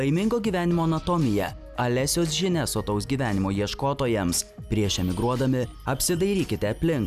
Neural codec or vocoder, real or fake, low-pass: none; real; 14.4 kHz